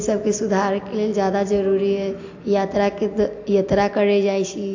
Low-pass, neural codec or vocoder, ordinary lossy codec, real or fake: 7.2 kHz; none; AAC, 48 kbps; real